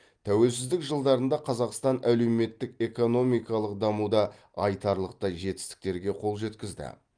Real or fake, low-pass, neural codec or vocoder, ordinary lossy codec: real; 9.9 kHz; none; Opus, 32 kbps